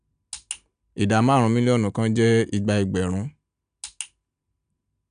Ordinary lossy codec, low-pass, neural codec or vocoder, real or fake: none; 9.9 kHz; none; real